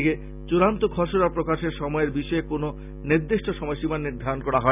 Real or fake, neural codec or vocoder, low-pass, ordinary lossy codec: real; none; 3.6 kHz; none